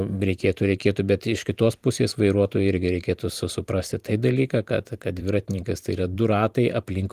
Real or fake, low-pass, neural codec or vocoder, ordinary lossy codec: fake; 14.4 kHz; vocoder, 44.1 kHz, 128 mel bands every 512 samples, BigVGAN v2; Opus, 24 kbps